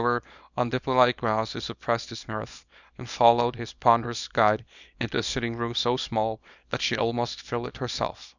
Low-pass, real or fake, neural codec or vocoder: 7.2 kHz; fake; codec, 24 kHz, 0.9 kbps, WavTokenizer, small release